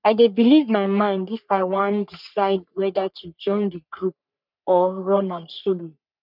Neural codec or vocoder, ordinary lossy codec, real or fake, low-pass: codec, 44.1 kHz, 3.4 kbps, Pupu-Codec; none; fake; 5.4 kHz